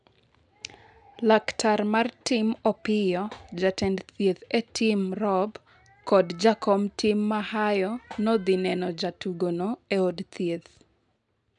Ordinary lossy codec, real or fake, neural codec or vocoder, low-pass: none; real; none; 9.9 kHz